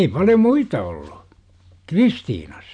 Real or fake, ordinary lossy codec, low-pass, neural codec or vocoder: real; none; 9.9 kHz; none